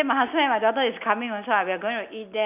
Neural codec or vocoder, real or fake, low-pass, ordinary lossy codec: none; real; 3.6 kHz; none